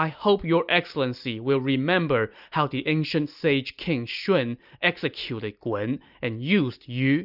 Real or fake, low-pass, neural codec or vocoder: real; 5.4 kHz; none